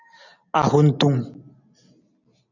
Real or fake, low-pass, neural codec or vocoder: real; 7.2 kHz; none